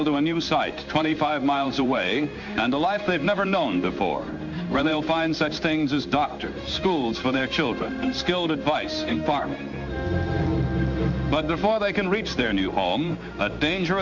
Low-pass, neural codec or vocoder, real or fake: 7.2 kHz; codec, 16 kHz in and 24 kHz out, 1 kbps, XY-Tokenizer; fake